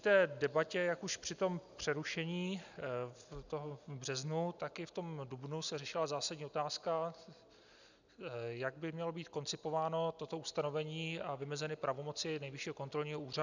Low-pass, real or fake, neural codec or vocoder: 7.2 kHz; real; none